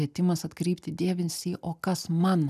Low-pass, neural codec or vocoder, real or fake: 14.4 kHz; none; real